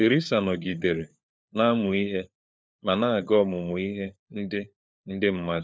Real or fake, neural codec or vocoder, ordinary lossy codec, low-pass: fake; codec, 16 kHz, 4 kbps, FunCodec, trained on LibriTTS, 50 frames a second; none; none